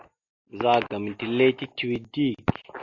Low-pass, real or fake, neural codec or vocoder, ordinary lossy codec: 7.2 kHz; real; none; AAC, 48 kbps